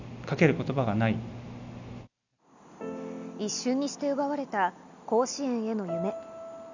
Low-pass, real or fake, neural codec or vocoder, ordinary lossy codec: 7.2 kHz; real; none; none